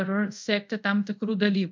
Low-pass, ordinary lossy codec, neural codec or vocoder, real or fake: 7.2 kHz; MP3, 64 kbps; codec, 24 kHz, 0.5 kbps, DualCodec; fake